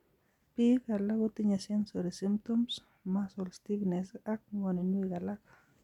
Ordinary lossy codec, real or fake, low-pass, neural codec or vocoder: none; real; 19.8 kHz; none